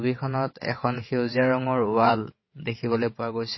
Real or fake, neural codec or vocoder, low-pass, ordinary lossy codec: fake; vocoder, 22.05 kHz, 80 mel bands, WaveNeXt; 7.2 kHz; MP3, 24 kbps